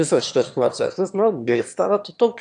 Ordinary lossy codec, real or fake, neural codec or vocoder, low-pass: AAC, 64 kbps; fake; autoencoder, 22.05 kHz, a latent of 192 numbers a frame, VITS, trained on one speaker; 9.9 kHz